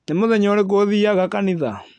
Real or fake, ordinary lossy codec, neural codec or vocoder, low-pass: real; AAC, 64 kbps; none; 9.9 kHz